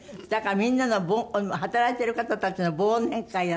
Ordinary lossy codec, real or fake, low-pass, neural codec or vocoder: none; real; none; none